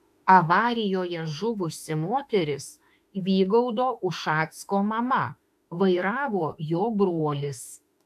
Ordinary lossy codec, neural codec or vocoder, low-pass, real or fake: AAC, 96 kbps; autoencoder, 48 kHz, 32 numbers a frame, DAC-VAE, trained on Japanese speech; 14.4 kHz; fake